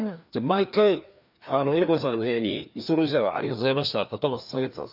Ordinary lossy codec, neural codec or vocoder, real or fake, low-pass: none; codec, 16 kHz, 2 kbps, FreqCodec, larger model; fake; 5.4 kHz